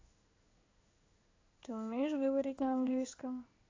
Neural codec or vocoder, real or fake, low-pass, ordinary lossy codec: codec, 16 kHz in and 24 kHz out, 1 kbps, XY-Tokenizer; fake; 7.2 kHz; AAC, 32 kbps